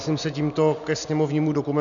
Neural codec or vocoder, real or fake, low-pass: none; real; 7.2 kHz